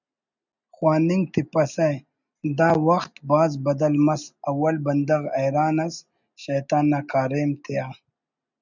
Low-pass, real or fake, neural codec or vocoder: 7.2 kHz; real; none